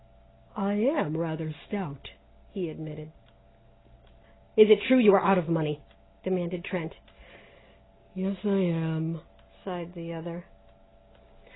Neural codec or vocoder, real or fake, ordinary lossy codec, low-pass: none; real; AAC, 16 kbps; 7.2 kHz